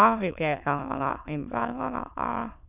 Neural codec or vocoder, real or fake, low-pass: autoencoder, 22.05 kHz, a latent of 192 numbers a frame, VITS, trained on many speakers; fake; 3.6 kHz